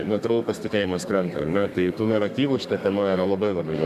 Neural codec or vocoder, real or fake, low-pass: codec, 32 kHz, 1.9 kbps, SNAC; fake; 14.4 kHz